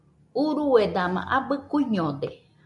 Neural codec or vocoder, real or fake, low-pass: none; real; 10.8 kHz